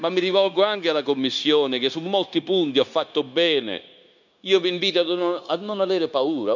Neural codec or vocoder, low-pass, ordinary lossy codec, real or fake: codec, 16 kHz, 0.9 kbps, LongCat-Audio-Codec; 7.2 kHz; none; fake